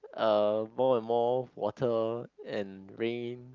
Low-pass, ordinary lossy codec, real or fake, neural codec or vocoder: 7.2 kHz; Opus, 24 kbps; real; none